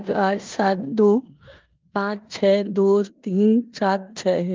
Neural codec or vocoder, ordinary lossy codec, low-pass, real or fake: codec, 16 kHz in and 24 kHz out, 0.9 kbps, LongCat-Audio-Codec, four codebook decoder; Opus, 32 kbps; 7.2 kHz; fake